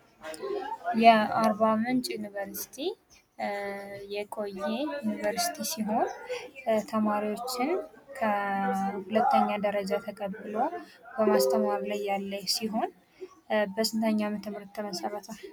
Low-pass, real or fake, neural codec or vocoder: 19.8 kHz; real; none